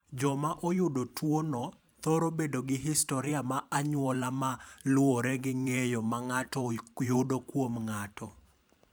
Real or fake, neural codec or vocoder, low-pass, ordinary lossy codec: fake; vocoder, 44.1 kHz, 128 mel bands every 512 samples, BigVGAN v2; none; none